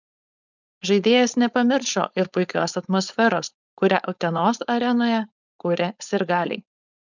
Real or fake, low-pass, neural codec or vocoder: fake; 7.2 kHz; codec, 16 kHz, 4.8 kbps, FACodec